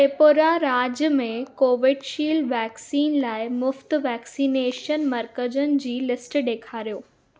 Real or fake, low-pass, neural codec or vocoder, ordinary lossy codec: real; none; none; none